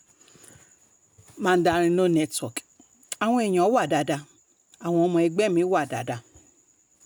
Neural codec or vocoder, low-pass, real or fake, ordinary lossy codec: none; none; real; none